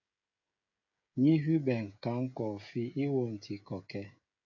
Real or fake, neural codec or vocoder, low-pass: fake; codec, 16 kHz, 16 kbps, FreqCodec, smaller model; 7.2 kHz